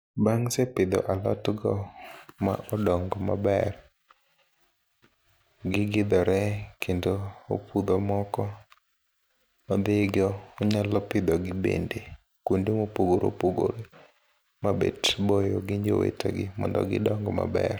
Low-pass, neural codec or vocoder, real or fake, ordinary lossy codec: none; none; real; none